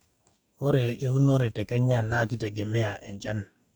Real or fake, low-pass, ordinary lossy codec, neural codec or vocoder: fake; none; none; codec, 44.1 kHz, 2.6 kbps, DAC